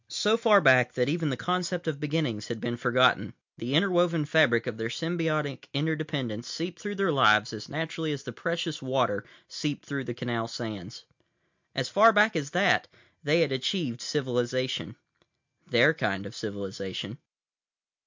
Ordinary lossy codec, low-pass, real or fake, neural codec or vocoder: MP3, 64 kbps; 7.2 kHz; real; none